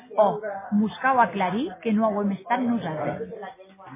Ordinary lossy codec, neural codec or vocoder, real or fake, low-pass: MP3, 16 kbps; none; real; 3.6 kHz